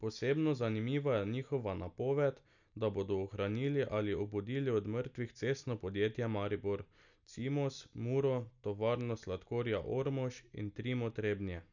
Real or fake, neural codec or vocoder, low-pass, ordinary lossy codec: real; none; 7.2 kHz; none